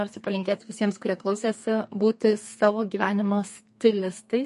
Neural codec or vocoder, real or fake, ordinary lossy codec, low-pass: codec, 32 kHz, 1.9 kbps, SNAC; fake; MP3, 48 kbps; 14.4 kHz